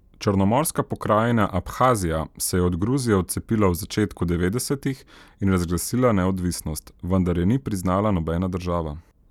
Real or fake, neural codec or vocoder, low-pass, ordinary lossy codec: real; none; 19.8 kHz; none